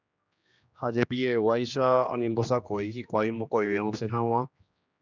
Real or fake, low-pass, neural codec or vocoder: fake; 7.2 kHz; codec, 16 kHz, 1 kbps, X-Codec, HuBERT features, trained on general audio